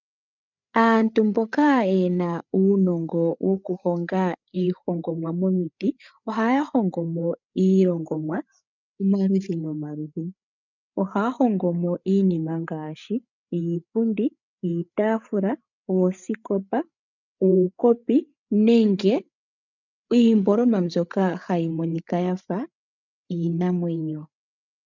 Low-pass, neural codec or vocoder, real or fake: 7.2 kHz; codec, 16 kHz, 8 kbps, FreqCodec, larger model; fake